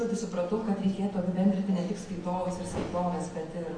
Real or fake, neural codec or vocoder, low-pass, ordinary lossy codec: real; none; 9.9 kHz; AAC, 32 kbps